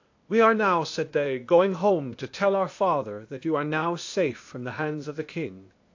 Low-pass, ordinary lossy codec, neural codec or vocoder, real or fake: 7.2 kHz; AAC, 48 kbps; codec, 16 kHz, 0.8 kbps, ZipCodec; fake